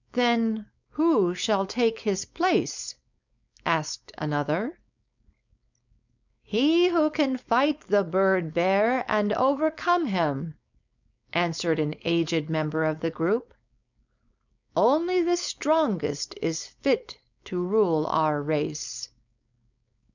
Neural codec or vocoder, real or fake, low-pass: codec, 16 kHz, 4.8 kbps, FACodec; fake; 7.2 kHz